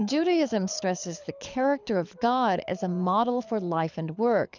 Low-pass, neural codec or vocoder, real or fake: 7.2 kHz; codec, 24 kHz, 6 kbps, HILCodec; fake